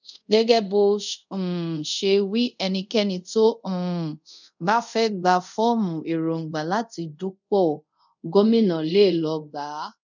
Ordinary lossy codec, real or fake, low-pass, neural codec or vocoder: none; fake; 7.2 kHz; codec, 24 kHz, 0.5 kbps, DualCodec